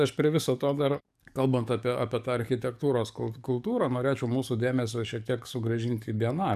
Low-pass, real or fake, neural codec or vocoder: 14.4 kHz; fake; codec, 44.1 kHz, 7.8 kbps, DAC